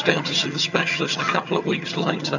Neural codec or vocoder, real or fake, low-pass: vocoder, 22.05 kHz, 80 mel bands, HiFi-GAN; fake; 7.2 kHz